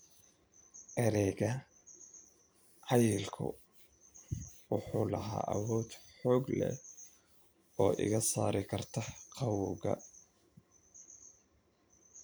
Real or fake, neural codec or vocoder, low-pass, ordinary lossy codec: fake; vocoder, 44.1 kHz, 128 mel bands every 512 samples, BigVGAN v2; none; none